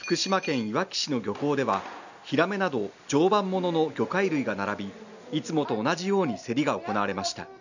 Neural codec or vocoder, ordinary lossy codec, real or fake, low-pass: none; none; real; 7.2 kHz